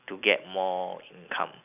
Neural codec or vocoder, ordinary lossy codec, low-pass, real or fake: none; none; 3.6 kHz; real